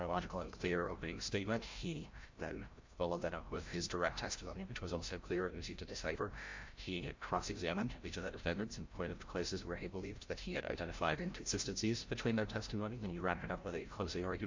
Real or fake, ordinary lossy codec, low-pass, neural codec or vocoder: fake; MP3, 48 kbps; 7.2 kHz; codec, 16 kHz, 0.5 kbps, FreqCodec, larger model